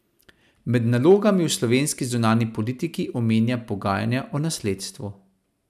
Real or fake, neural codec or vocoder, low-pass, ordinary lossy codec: real; none; 14.4 kHz; none